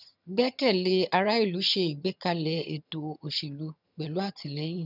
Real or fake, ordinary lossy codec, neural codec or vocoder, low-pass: fake; none; vocoder, 22.05 kHz, 80 mel bands, HiFi-GAN; 5.4 kHz